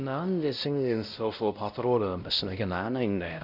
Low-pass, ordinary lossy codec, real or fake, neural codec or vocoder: 5.4 kHz; none; fake; codec, 16 kHz, 0.5 kbps, X-Codec, WavLM features, trained on Multilingual LibriSpeech